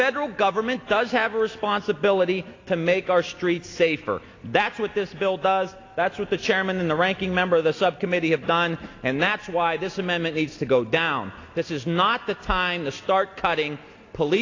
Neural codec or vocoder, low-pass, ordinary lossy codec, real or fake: none; 7.2 kHz; AAC, 32 kbps; real